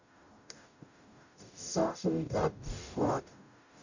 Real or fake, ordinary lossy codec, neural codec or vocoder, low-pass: fake; none; codec, 44.1 kHz, 0.9 kbps, DAC; 7.2 kHz